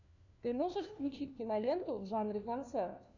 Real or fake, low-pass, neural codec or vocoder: fake; 7.2 kHz; codec, 16 kHz, 1 kbps, FunCodec, trained on LibriTTS, 50 frames a second